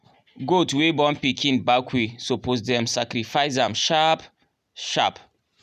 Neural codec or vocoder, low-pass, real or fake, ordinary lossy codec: none; 14.4 kHz; real; none